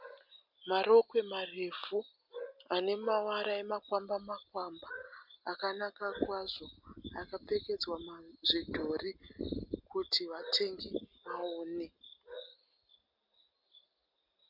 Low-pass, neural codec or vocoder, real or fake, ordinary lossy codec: 5.4 kHz; none; real; MP3, 48 kbps